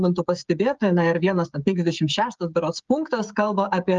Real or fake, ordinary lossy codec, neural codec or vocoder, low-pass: fake; Opus, 32 kbps; codec, 16 kHz, 16 kbps, FreqCodec, smaller model; 7.2 kHz